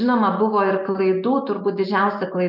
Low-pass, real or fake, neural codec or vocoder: 5.4 kHz; real; none